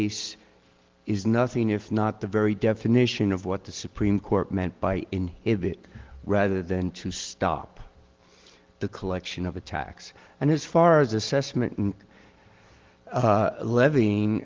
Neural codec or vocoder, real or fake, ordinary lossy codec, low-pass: none; real; Opus, 16 kbps; 7.2 kHz